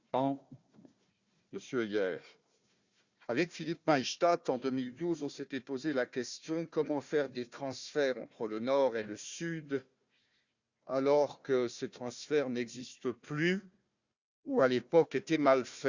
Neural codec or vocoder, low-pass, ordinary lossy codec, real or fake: codec, 16 kHz, 1 kbps, FunCodec, trained on Chinese and English, 50 frames a second; 7.2 kHz; Opus, 64 kbps; fake